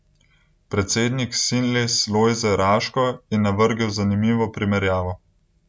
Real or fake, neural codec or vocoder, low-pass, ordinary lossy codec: real; none; none; none